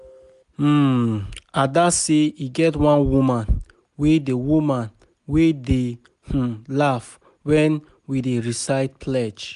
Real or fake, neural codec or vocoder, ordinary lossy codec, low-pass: real; none; none; 10.8 kHz